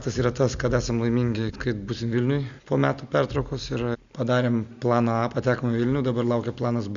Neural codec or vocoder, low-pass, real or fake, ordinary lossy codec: none; 7.2 kHz; real; Opus, 64 kbps